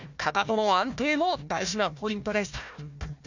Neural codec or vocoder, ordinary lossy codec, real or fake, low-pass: codec, 16 kHz, 1 kbps, FunCodec, trained on Chinese and English, 50 frames a second; none; fake; 7.2 kHz